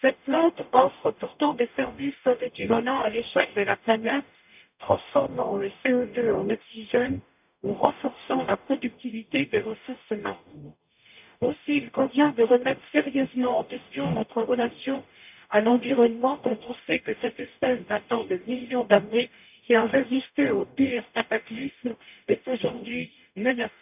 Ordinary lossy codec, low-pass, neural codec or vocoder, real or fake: none; 3.6 kHz; codec, 44.1 kHz, 0.9 kbps, DAC; fake